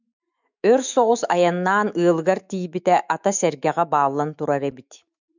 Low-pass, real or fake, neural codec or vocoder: 7.2 kHz; fake; autoencoder, 48 kHz, 128 numbers a frame, DAC-VAE, trained on Japanese speech